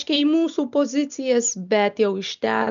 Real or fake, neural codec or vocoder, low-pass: real; none; 7.2 kHz